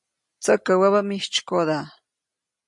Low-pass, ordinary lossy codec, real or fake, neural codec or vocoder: 10.8 kHz; MP3, 48 kbps; real; none